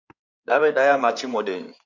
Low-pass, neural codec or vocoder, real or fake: 7.2 kHz; codec, 16 kHz in and 24 kHz out, 2.2 kbps, FireRedTTS-2 codec; fake